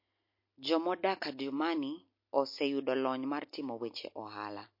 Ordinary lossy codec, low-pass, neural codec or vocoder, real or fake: MP3, 32 kbps; 5.4 kHz; none; real